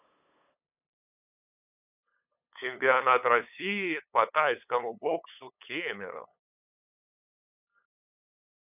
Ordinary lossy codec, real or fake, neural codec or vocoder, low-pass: none; fake; codec, 16 kHz, 8 kbps, FunCodec, trained on LibriTTS, 25 frames a second; 3.6 kHz